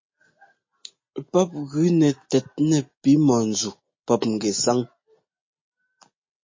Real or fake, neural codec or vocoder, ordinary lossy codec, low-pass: real; none; MP3, 32 kbps; 7.2 kHz